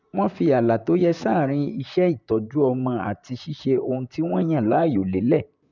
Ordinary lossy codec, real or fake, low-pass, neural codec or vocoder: none; fake; 7.2 kHz; vocoder, 44.1 kHz, 128 mel bands, Pupu-Vocoder